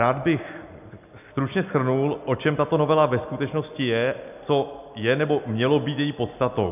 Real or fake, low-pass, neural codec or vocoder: real; 3.6 kHz; none